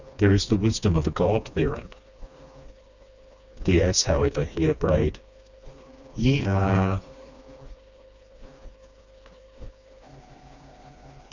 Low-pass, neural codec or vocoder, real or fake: 7.2 kHz; codec, 16 kHz, 2 kbps, FreqCodec, smaller model; fake